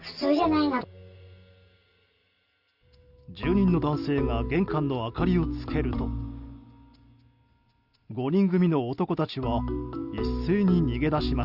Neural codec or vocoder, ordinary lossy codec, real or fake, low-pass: none; Opus, 64 kbps; real; 5.4 kHz